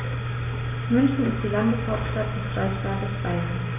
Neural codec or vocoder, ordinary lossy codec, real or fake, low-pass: none; none; real; 3.6 kHz